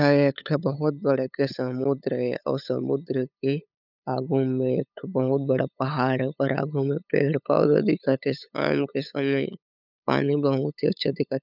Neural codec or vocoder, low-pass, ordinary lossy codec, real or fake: codec, 16 kHz, 8 kbps, FunCodec, trained on LibriTTS, 25 frames a second; 5.4 kHz; none; fake